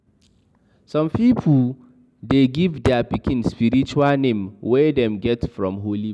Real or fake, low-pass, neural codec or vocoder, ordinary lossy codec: real; none; none; none